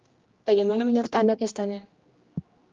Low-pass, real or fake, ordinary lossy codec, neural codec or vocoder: 7.2 kHz; fake; Opus, 32 kbps; codec, 16 kHz, 1 kbps, X-Codec, HuBERT features, trained on general audio